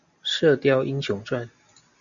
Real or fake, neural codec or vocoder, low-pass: real; none; 7.2 kHz